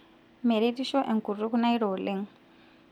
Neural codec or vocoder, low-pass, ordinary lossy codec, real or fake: none; 19.8 kHz; none; real